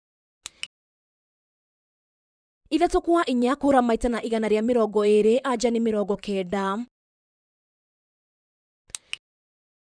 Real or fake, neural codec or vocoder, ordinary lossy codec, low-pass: fake; vocoder, 44.1 kHz, 128 mel bands, Pupu-Vocoder; none; 9.9 kHz